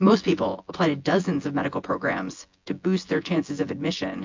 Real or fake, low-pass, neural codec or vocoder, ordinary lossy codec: fake; 7.2 kHz; vocoder, 24 kHz, 100 mel bands, Vocos; MP3, 48 kbps